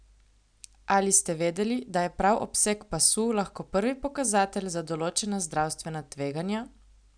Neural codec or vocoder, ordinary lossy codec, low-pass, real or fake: none; none; 9.9 kHz; real